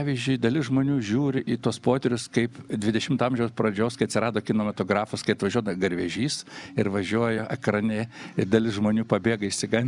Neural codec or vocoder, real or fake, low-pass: none; real; 10.8 kHz